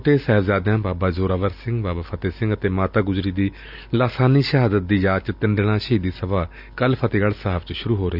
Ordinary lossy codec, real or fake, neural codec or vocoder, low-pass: none; real; none; 5.4 kHz